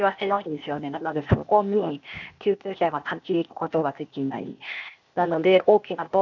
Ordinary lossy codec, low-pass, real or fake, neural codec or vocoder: none; 7.2 kHz; fake; codec, 16 kHz, 0.8 kbps, ZipCodec